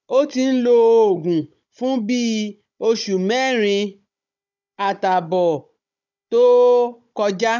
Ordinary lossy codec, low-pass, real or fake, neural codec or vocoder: none; 7.2 kHz; fake; codec, 16 kHz, 16 kbps, FunCodec, trained on Chinese and English, 50 frames a second